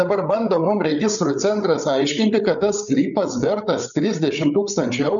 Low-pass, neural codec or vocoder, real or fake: 7.2 kHz; codec, 16 kHz, 8 kbps, FreqCodec, larger model; fake